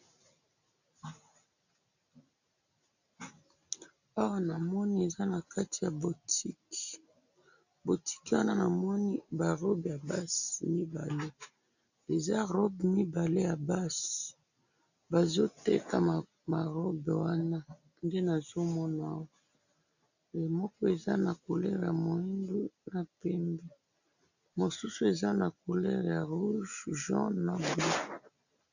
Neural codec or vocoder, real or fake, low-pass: none; real; 7.2 kHz